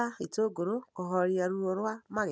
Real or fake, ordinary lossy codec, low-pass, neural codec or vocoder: real; none; none; none